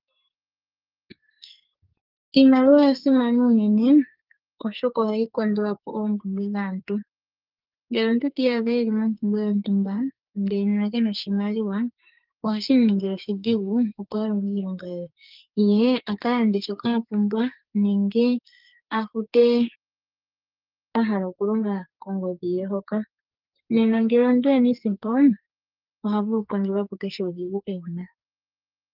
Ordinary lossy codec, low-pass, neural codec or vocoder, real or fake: Opus, 24 kbps; 5.4 kHz; codec, 44.1 kHz, 2.6 kbps, SNAC; fake